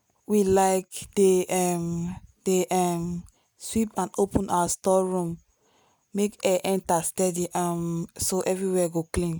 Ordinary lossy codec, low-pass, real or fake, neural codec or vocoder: none; none; real; none